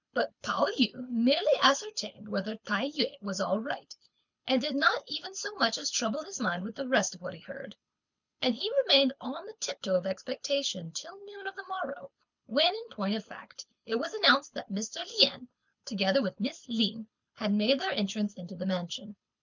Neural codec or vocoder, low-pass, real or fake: codec, 24 kHz, 6 kbps, HILCodec; 7.2 kHz; fake